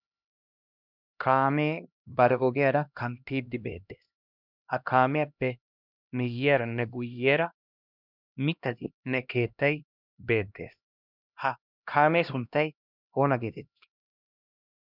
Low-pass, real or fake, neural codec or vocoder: 5.4 kHz; fake; codec, 16 kHz, 1 kbps, X-Codec, HuBERT features, trained on LibriSpeech